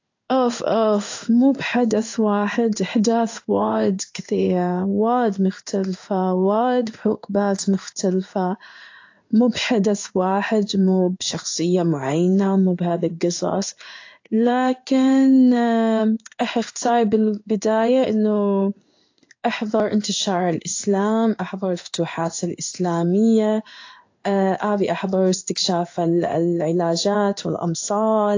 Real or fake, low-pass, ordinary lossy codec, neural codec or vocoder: fake; 7.2 kHz; AAC, 48 kbps; codec, 16 kHz in and 24 kHz out, 1 kbps, XY-Tokenizer